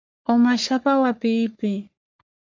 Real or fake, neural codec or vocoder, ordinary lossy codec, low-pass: fake; codec, 44.1 kHz, 3.4 kbps, Pupu-Codec; MP3, 64 kbps; 7.2 kHz